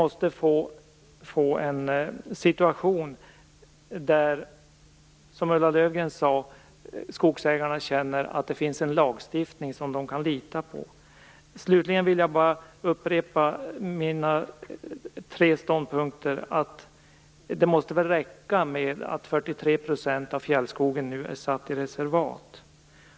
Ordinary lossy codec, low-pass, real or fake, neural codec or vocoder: none; none; real; none